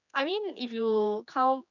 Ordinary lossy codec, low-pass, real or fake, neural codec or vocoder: none; 7.2 kHz; fake; codec, 16 kHz, 2 kbps, X-Codec, HuBERT features, trained on general audio